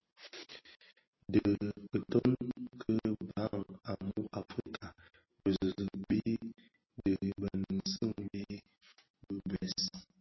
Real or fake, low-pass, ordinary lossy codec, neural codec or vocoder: real; 7.2 kHz; MP3, 24 kbps; none